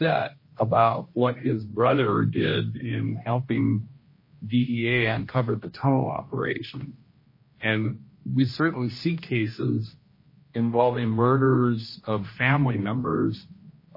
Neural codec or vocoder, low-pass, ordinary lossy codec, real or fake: codec, 16 kHz, 1 kbps, X-Codec, HuBERT features, trained on general audio; 5.4 kHz; MP3, 24 kbps; fake